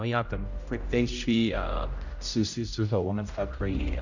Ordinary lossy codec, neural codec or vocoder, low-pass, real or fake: none; codec, 16 kHz, 0.5 kbps, X-Codec, HuBERT features, trained on general audio; 7.2 kHz; fake